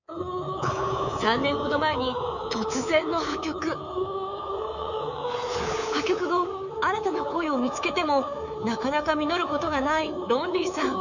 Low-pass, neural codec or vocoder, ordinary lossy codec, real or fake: 7.2 kHz; codec, 24 kHz, 3.1 kbps, DualCodec; none; fake